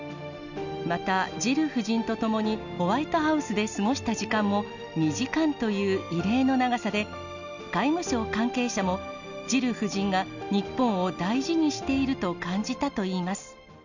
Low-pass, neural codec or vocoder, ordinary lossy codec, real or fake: 7.2 kHz; none; none; real